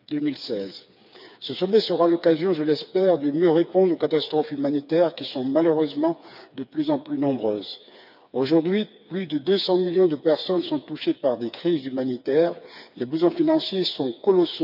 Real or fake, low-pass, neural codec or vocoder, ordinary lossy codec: fake; 5.4 kHz; codec, 16 kHz, 4 kbps, FreqCodec, smaller model; none